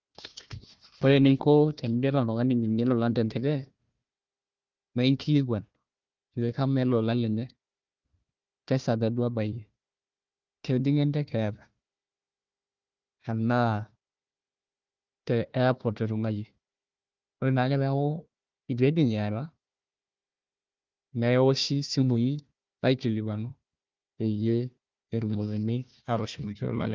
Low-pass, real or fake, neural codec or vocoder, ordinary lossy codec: 7.2 kHz; fake; codec, 16 kHz, 1 kbps, FunCodec, trained on Chinese and English, 50 frames a second; Opus, 24 kbps